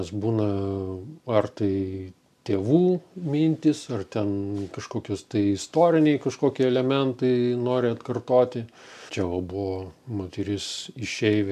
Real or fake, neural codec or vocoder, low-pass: real; none; 14.4 kHz